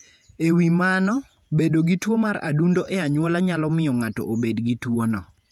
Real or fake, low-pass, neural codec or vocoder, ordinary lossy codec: fake; 19.8 kHz; vocoder, 48 kHz, 128 mel bands, Vocos; none